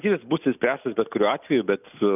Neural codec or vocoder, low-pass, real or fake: none; 3.6 kHz; real